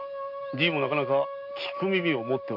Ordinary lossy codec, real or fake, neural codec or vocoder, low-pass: MP3, 32 kbps; real; none; 5.4 kHz